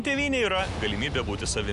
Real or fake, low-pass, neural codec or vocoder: real; 10.8 kHz; none